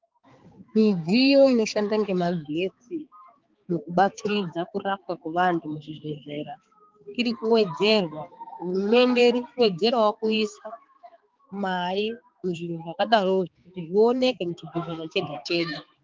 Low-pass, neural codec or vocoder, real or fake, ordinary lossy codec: 7.2 kHz; codec, 16 kHz, 4 kbps, X-Codec, HuBERT features, trained on balanced general audio; fake; Opus, 32 kbps